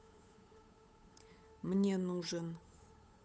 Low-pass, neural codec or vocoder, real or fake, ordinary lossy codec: none; codec, 16 kHz, 8 kbps, FunCodec, trained on Chinese and English, 25 frames a second; fake; none